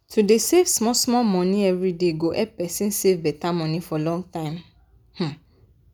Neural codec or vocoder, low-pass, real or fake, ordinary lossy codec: none; none; real; none